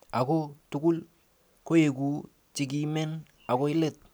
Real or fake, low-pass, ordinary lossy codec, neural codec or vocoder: real; none; none; none